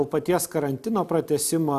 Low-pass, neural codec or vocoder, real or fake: 14.4 kHz; none; real